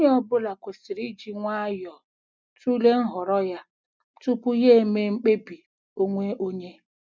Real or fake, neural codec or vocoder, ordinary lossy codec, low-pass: real; none; none; none